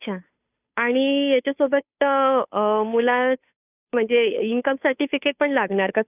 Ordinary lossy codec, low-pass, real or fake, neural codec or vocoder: none; 3.6 kHz; fake; codec, 16 kHz, 2 kbps, FunCodec, trained on Chinese and English, 25 frames a second